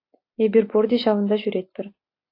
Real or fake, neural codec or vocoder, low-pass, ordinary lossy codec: real; none; 5.4 kHz; AAC, 32 kbps